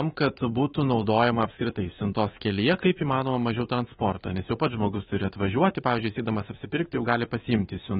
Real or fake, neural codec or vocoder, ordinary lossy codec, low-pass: fake; codec, 24 kHz, 3.1 kbps, DualCodec; AAC, 16 kbps; 10.8 kHz